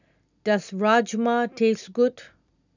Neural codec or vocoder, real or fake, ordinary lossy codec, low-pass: none; real; none; 7.2 kHz